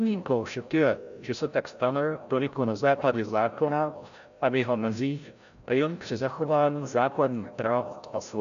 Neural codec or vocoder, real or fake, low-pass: codec, 16 kHz, 0.5 kbps, FreqCodec, larger model; fake; 7.2 kHz